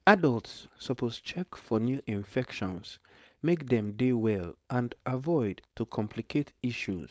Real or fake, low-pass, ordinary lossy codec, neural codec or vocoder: fake; none; none; codec, 16 kHz, 4.8 kbps, FACodec